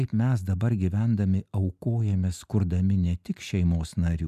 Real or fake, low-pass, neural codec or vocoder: real; 14.4 kHz; none